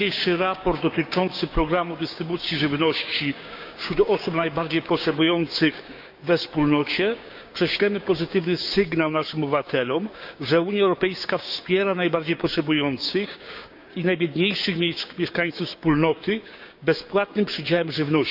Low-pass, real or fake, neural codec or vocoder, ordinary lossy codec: 5.4 kHz; fake; codec, 16 kHz, 6 kbps, DAC; none